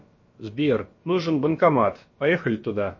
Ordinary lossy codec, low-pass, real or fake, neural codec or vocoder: MP3, 32 kbps; 7.2 kHz; fake; codec, 16 kHz, about 1 kbps, DyCAST, with the encoder's durations